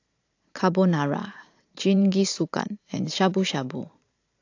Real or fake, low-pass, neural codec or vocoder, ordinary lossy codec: fake; 7.2 kHz; vocoder, 44.1 kHz, 128 mel bands every 512 samples, BigVGAN v2; AAC, 48 kbps